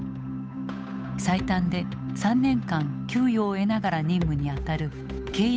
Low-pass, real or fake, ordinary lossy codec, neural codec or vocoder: none; fake; none; codec, 16 kHz, 8 kbps, FunCodec, trained on Chinese and English, 25 frames a second